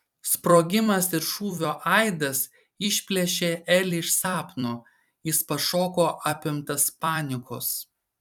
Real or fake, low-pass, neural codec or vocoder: fake; 19.8 kHz; vocoder, 44.1 kHz, 128 mel bands every 256 samples, BigVGAN v2